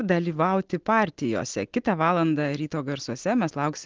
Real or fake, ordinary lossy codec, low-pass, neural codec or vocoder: real; Opus, 16 kbps; 7.2 kHz; none